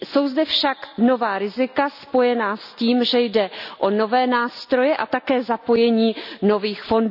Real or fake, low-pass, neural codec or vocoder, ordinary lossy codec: real; 5.4 kHz; none; none